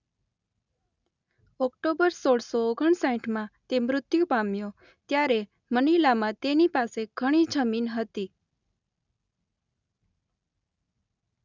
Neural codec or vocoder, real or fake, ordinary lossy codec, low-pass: none; real; none; 7.2 kHz